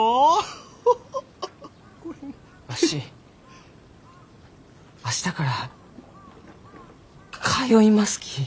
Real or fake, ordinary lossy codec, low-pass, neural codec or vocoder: real; none; none; none